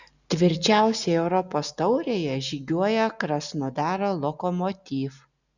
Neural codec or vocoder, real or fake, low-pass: none; real; 7.2 kHz